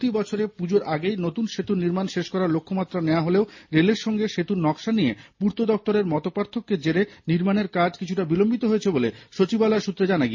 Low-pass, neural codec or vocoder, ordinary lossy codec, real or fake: 7.2 kHz; none; MP3, 32 kbps; real